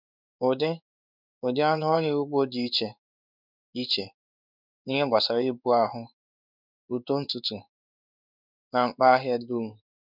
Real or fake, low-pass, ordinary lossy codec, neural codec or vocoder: fake; 5.4 kHz; none; codec, 16 kHz in and 24 kHz out, 1 kbps, XY-Tokenizer